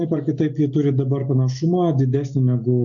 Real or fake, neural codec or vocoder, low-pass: real; none; 7.2 kHz